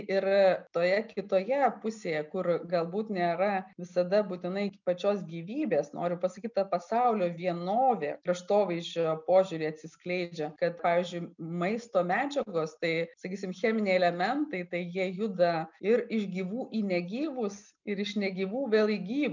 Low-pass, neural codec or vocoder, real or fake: 7.2 kHz; none; real